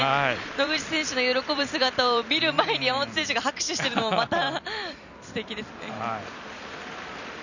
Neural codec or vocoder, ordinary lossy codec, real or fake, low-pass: vocoder, 44.1 kHz, 128 mel bands every 256 samples, BigVGAN v2; none; fake; 7.2 kHz